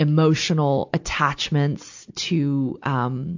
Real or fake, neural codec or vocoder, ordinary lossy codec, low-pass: real; none; AAC, 48 kbps; 7.2 kHz